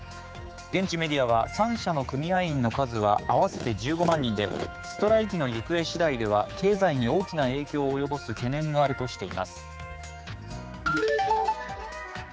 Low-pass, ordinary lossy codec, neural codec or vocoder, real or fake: none; none; codec, 16 kHz, 4 kbps, X-Codec, HuBERT features, trained on general audio; fake